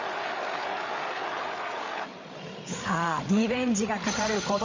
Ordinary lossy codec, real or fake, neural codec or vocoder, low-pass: MP3, 48 kbps; fake; codec, 16 kHz, 8 kbps, FreqCodec, larger model; 7.2 kHz